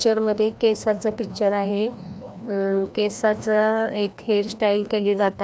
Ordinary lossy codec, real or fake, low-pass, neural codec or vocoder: none; fake; none; codec, 16 kHz, 1 kbps, FreqCodec, larger model